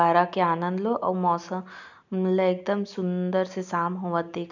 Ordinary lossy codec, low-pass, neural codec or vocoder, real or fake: none; 7.2 kHz; none; real